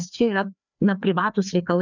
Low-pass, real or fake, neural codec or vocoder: 7.2 kHz; fake; codec, 16 kHz, 2 kbps, FreqCodec, larger model